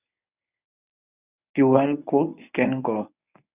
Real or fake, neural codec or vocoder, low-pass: fake; codec, 24 kHz, 0.9 kbps, WavTokenizer, medium speech release version 1; 3.6 kHz